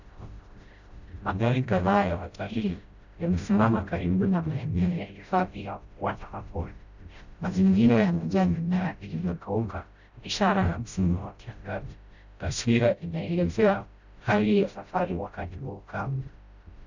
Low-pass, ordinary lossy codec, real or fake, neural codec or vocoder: 7.2 kHz; Opus, 64 kbps; fake; codec, 16 kHz, 0.5 kbps, FreqCodec, smaller model